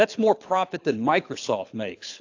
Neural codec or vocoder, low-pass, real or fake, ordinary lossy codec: codec, 24 kHz, 6 kbps, HILCodec; 7.2 kHz; fake; AAC, 48 kbps